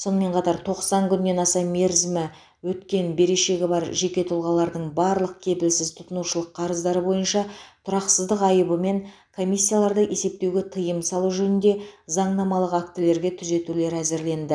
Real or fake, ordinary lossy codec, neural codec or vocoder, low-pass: real; none; none; 9.9 kHz